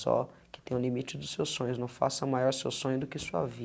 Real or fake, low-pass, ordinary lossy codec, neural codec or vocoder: real; none; none; none